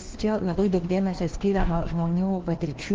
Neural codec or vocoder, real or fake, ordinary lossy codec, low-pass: codec, 16 kHz, 1 kbps, FunCodec, trained on LibriTTS, 50 frames a second; fake; Opus, 16 kbps; 7.2 kHz